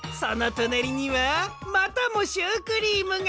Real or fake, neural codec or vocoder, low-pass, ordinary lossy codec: real; none; none; none